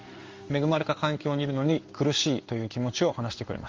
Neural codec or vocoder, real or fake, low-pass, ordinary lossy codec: codec, 16 kHz in and 24 kHz out, 1 kbps, XY-Tokenizer; fake; 7.2 kHz; Opus, 32 kbps